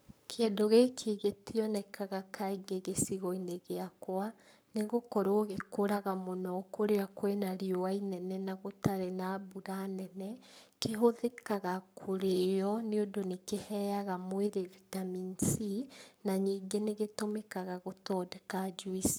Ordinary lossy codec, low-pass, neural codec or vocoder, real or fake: none; none; codec, 44.1 kHz, 7.8 kbps, Pupu-Codec; fake